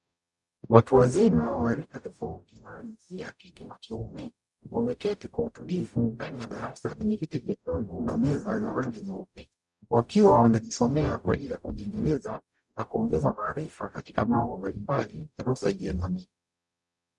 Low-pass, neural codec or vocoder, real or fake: 10.8 kHz; codec, 44.1 kHz, 0.9 kbps, DAC; fake